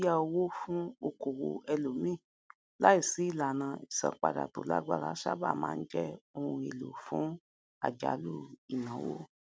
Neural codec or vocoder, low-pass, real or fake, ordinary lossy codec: none; none; real; none